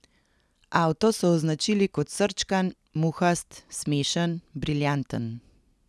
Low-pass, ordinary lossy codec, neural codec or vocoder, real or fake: none; none; none; real